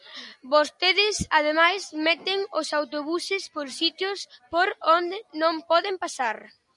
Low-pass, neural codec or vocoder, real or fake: 10.8 kHz; none; real